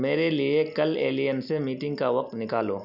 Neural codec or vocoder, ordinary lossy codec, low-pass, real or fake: none; none; 5.4 kHz; real